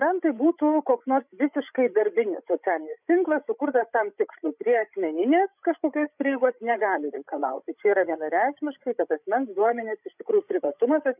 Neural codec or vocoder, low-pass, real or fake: codec, 16 kHz, 16 kbps, FreqCodec, larger model; 3.6 kHz; fake